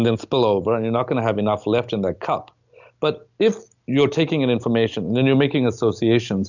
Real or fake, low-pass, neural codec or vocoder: real; 7.2 kHz; none